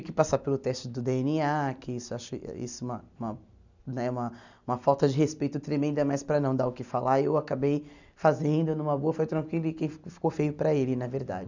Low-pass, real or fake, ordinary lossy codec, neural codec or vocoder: 7.2 kHz; real; none; none